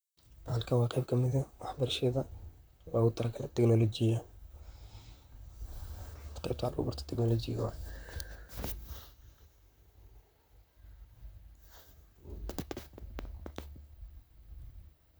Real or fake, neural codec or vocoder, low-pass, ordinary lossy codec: fake; vocoder, 44.1 kHz, 128 mel bands, Pupu-Vocoder; none; none